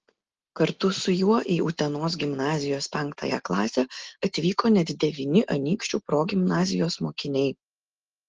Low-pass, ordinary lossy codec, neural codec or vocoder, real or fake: 7.2 kHz; Opus, 16 kbps; none; real